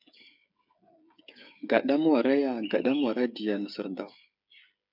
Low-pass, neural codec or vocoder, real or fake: 5.4 kHz; codec, 16 kHz, 8 kbps, FreqCodec, smaller model; fake